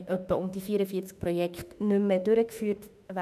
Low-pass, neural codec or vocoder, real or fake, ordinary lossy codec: 14.4 kHz; autoencoder, 48 kHz, 32 numbers a frame, DAC-VAE, trained on Japanese speech; fake; none